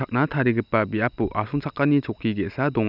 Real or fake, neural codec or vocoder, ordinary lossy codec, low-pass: real; none; none; 5.4 kHz